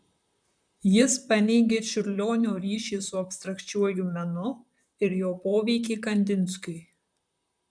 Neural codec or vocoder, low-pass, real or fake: vocoder, 44.1 kHz, 128 mel bands, Pupu-Vocoder; 9.9 kHz; fake